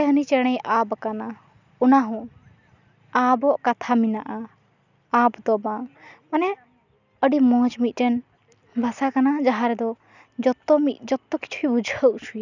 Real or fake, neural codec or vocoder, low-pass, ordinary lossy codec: real; none; 7.2 kHz; none